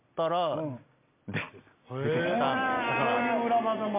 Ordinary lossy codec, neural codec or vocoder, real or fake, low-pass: MP3, 32 kbps; none; real; 3.6 kHz